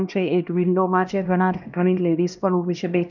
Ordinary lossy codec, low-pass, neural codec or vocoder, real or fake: none; 7.2 kHz; codec, 16 kHz, 1 kbps, X-Codec, HuBERT features, trained on LibriSpeech; fake